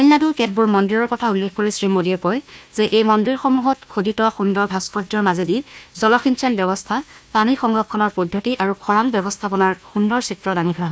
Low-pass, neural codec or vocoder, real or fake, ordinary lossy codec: none; codec, 16 kHz, 1 kbps, FunCodec, trained on Chinese and English, 50 frames a second; fake; none